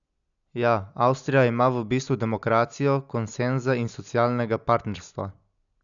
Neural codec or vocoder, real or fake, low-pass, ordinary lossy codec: none; real; 7.2 kHz; none